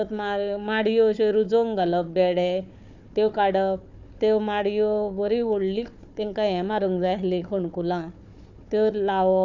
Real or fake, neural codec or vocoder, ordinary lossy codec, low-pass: fake; codec, 16 kHz, 4 kbps, FunCodec, trained on Chinese and English, 50 frames a second; none; 7.2 kHz